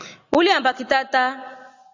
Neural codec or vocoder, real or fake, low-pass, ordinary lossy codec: none; real; 7.2 kHz; AAC, 48 kbps